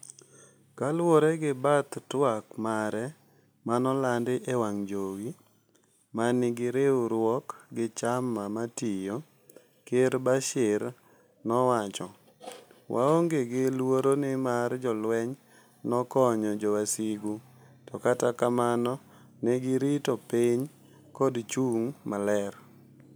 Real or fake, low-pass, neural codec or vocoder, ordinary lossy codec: real; none; none; none